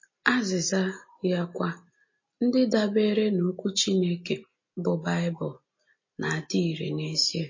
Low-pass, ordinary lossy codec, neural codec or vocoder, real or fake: 7.2 kHz; MP3, 32 kbps; none; real